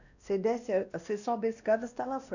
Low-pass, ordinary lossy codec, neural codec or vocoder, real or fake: 7.2 kHz; none; codec, 16 kHz, 1 kbps, X-Codec, WavLM features, trained on Multilingual LibriSpeech; fake